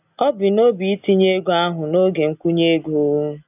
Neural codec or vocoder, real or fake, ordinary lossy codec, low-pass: none; real; none; 3.6 kHz